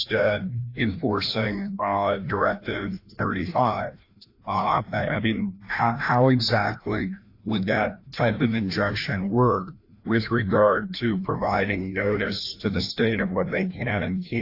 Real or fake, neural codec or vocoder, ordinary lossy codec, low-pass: fake; codec, 16 kHz, 1 kbps, FreqCodec, larger model; AAC, 32 kbps; 5.4 kHz